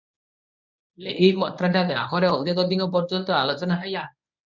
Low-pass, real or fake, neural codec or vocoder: 7.2 kHz; fake; codec, 24 kHz, 0.9 kbps, WavTokenizer, medium speech release version 1